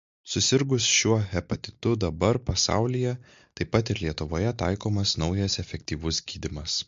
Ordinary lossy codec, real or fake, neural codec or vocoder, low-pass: MP3, 48 kbps; real; none; 7.2 kHz